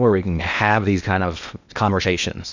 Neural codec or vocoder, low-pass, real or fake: codec, 16 kHz in and 24 kHz out, 0.8 kbps, FocalCodec, streaming, 65536 codes; 7.2 kHz; fake